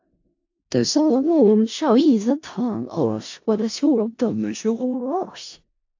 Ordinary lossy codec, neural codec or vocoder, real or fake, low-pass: AAC, 48 kbps; codec, 16 kHz in and 24 kHz out, 0.4 kbps, LongCat-Audio-Codec, four codebook decoder; fake; 7.2 kHz